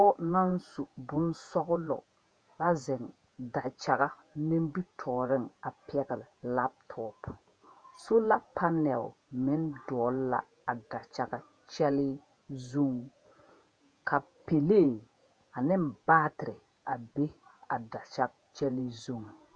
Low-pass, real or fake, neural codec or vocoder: 9.9 kHz; fake; vocoder, 48 kHz, 128 mel bands, Vocos